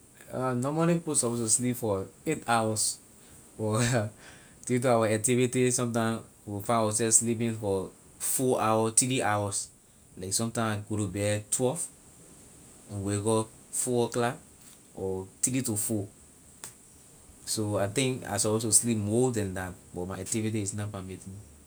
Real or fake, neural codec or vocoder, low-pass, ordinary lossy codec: real; none; none; none